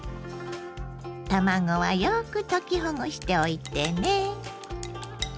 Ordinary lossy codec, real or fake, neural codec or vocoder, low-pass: none; real; none; none